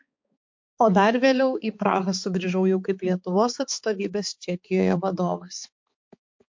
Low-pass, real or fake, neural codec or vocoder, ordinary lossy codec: 7.2 kHz; fake; codec, 16 kHz, 4 kbps, X-Codec, HuBERT features, trained on balanced general audio; MP3, 48 kbps